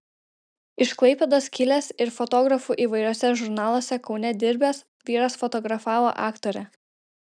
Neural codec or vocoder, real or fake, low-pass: none; real; 9.9 kHz